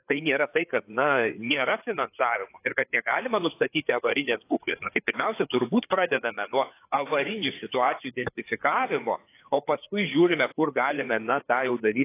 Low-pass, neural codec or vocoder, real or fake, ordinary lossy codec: 3.6 kHz; codec, 16 kHz, 4 kbps, FreqCodec, larger model; fake; AAC, 24 kbps